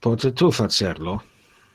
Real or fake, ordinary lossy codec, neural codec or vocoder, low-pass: real; Opus, 16 kbps; none; 14.4 kHz